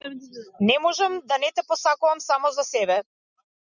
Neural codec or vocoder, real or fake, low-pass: none; real; 7.2 kHz